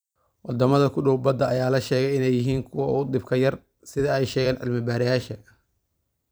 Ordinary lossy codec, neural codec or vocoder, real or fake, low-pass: none; vocoder, 44.1 kHz, 128 mel bands every 256 samples, BigVGAN v2; fake; none